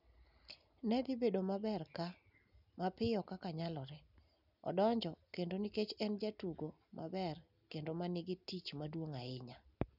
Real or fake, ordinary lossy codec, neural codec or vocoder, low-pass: real; none; none; 5.4 kHz